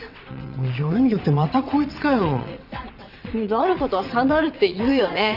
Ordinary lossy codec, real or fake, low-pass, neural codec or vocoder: none; fake; 5.4 kHz; vocoder, 22.05 kHz, 80 mel bands, WaveNeXt